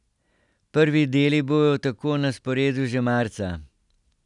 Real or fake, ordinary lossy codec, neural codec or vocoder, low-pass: real; none; none; 10.8 kHz